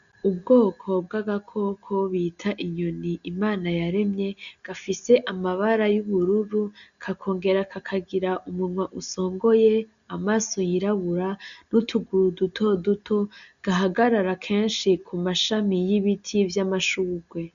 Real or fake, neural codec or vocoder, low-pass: real; none; 7.2 kHz